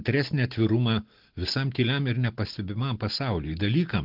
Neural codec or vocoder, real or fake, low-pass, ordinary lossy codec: none; real; 5.4 kHz; Opus, 16 kbps